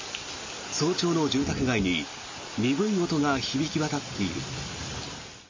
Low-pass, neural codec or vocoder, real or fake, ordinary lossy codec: 7.2 kHz; none; real; MP3, 32 kbps